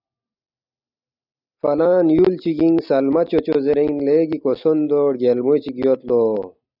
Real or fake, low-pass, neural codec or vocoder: real; 5.4 kHz; none